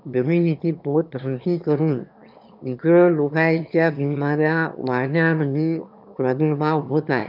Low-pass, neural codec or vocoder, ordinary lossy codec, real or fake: 5.4 kHz; autoencoder, 22.05 kHz, a latent of 192 numbers a frame, VITS, trained on one speaker; none; fake